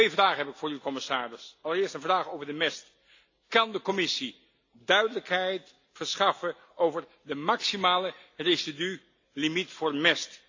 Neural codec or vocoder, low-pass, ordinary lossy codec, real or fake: none; 7.2 kHz; AAC, 48 kbps; real